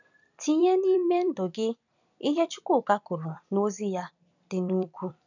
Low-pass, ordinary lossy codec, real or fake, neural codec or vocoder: 7.2 kHz; none; fake; vocoder, 44.1 kHz, 128 mel bands every 256 samples, BigVGAN v2